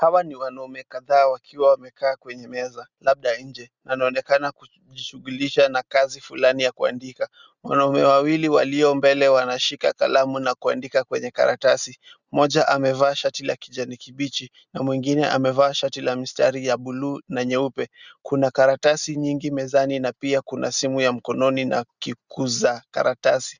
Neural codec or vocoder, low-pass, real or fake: none; 7.2 kHz; real